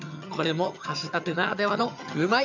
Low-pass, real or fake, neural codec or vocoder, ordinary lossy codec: 7.2 kHz; fake; vocoder, 22.05 kHz, 80 mel bands, HiFi-GAN; MP3, 64 kbps